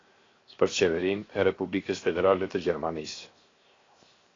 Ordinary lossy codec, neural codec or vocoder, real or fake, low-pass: AAC, 32 kbps; codec, 16 kHz, 0.7 kbps, FocalCodec; fake; 7.2 kHz